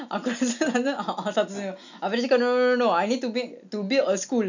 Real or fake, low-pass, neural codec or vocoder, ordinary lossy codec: real; 7.2 kHz; none; none